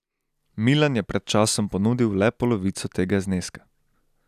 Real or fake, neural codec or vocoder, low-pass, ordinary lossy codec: real; none; 14.4 kHz; none